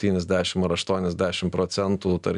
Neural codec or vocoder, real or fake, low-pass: none; real; 10.8 kHz